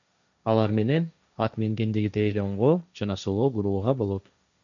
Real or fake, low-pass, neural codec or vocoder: fake; 7.2 kHz; codec, 16 kHz, 1.1 kbps, Voila-Tokenizer